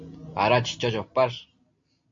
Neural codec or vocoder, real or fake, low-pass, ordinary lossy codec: none; real; 7.2 kHz; MP3, 48 kbps